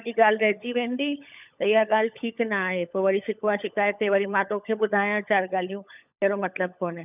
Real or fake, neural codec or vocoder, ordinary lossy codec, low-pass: fake; codec, 16 kHz, 16 kbps, FunCodec, trained on LibriTTS, 50 frames a second; none; 3.6 kHz